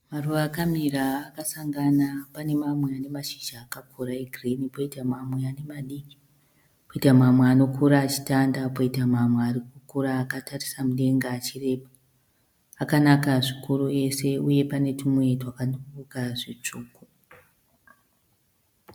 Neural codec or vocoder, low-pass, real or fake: none; 19.8 kHz; real